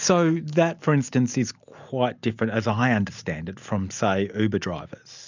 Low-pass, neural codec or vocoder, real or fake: 7.2 kHz; none; real